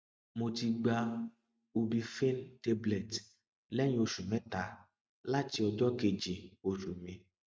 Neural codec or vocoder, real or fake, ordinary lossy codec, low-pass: none; real; none; none